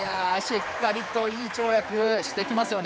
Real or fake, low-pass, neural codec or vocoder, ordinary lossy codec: fake; none; codec, 16 kHz, 4 kbps, X-Codec, HuBERT features, trained on general audio; none